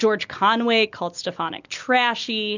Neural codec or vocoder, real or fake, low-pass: none; real; 7.2 kHz